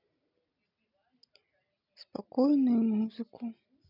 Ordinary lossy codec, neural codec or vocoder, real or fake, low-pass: none; none; real; 5.4 kHz